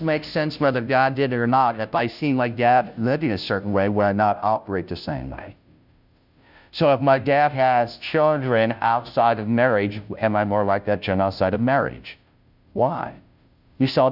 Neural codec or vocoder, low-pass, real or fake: codec, 16 kHz, 0.5 kbps, FunCodec, trained on Chinese and English, 25 frames a second; 5.4 kHz; fake